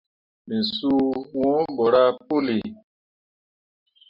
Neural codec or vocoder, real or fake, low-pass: none; real; 5.4 kHz